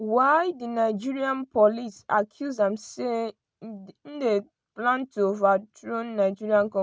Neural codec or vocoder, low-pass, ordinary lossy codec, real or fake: none; none; none; real